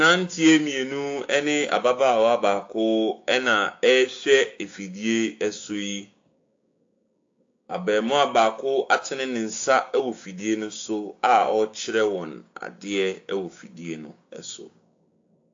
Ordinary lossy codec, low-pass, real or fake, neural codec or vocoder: AAC, 48 kbps; 7.2 kHz; fake; codec, 16 kHz, 6 kbps, DAC